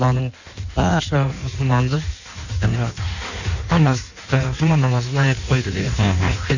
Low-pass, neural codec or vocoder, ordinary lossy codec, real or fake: 7.2 kHz; codec, 16 kHz in and 24 kHz out, 1.1 kbps, FireRedTTS-2 codec; none; fake